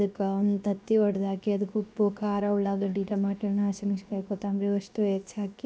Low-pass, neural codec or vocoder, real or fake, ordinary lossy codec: none; codec, 16 kHz, 0.7 kbps, FocalCodec; fake; none